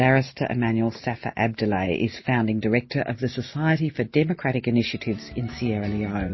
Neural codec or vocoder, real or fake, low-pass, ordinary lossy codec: none; real; 7.2 kHz; MP3, 24 kbps